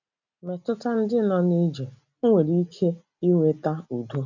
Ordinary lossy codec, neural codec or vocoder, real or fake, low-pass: none; none; real; 7.2 kHz